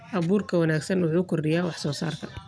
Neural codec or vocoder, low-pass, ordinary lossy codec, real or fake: none; none; none; real